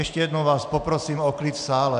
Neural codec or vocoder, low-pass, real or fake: none; 9.9 kHz; real